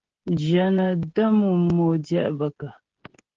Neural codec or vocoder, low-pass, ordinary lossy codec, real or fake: codec, 16 kHz, 16 kbps, FreqCodec, smaller model; 7.2 kHz; Opus, 16 kbps; fake